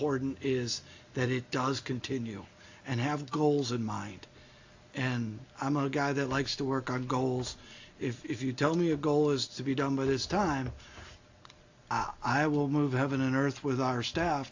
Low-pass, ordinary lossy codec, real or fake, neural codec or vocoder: 7.2 kHz; AAC, 48 kbps; real; none